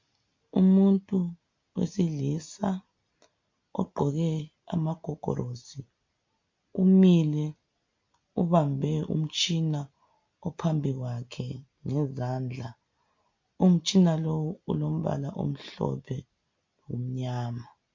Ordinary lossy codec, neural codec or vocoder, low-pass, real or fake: MP3, 48 kbps; none; 7.2 kHz; real